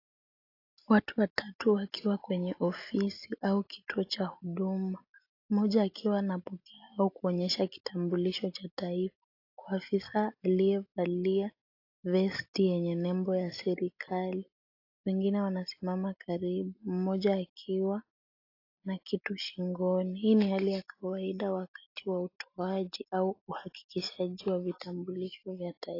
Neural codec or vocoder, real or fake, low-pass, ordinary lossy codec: none; real; 5.4 kHz; AAC, 32 kbps